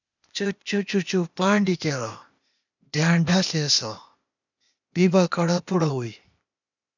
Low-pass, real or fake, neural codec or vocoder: 7.2 kHz; fake; codec, 16 kHz, 0.8 kbps, ZipCodec